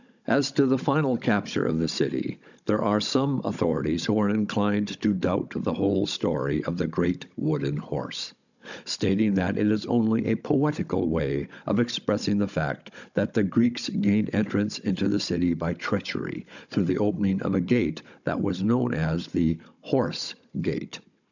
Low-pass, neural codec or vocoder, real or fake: 7.2 kHz; codec, 16 kHz, 16 kbps, FunCodec, trained on Chinese and English, 50 frames a second; fake